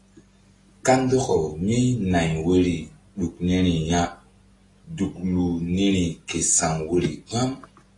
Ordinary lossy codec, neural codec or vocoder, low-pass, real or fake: AAC, 32 kbps; none; 10.8 kHz; real